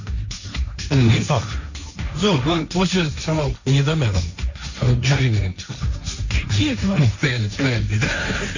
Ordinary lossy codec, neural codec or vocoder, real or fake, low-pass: none; codec, 16 kHz, 1.1 kbps, Voila-Tokenizer; fake; 7.2 kHz